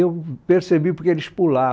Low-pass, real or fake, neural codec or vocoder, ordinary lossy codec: none; real; none; none